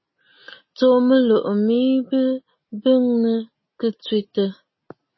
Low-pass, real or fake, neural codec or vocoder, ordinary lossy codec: 7.2 kHz; real; none; MP3, 24 kbps